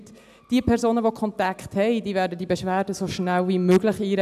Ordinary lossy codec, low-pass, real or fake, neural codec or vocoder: AAC, 96 kbps; 14.4 kHz; real; none